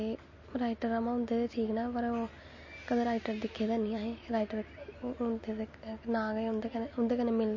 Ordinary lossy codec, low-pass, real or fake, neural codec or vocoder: MP3, 32 kbps; 7.2 kHz; real; none